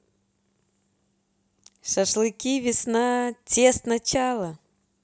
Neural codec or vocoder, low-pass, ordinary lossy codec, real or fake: none; none; none; real